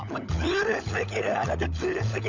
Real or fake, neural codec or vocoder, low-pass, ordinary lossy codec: fake; codec, 16 kHz, 16 kbps, FunCodec, trained on LibriTTS, 50 frames a second; 7.2 kHz; none